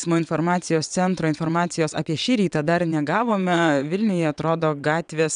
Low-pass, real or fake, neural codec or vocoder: 9.9 kHz; fake; vocoder, 22.05 kHz, 80 mel bands, WaveNeXt